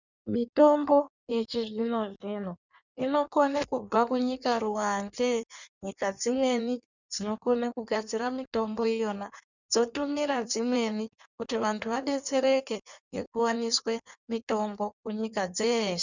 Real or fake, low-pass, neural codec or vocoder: fake; 7.2 kHz; codec, 16 kHz in and 24 kHz out, 1.1 kbps, FireRedTTS-2 codec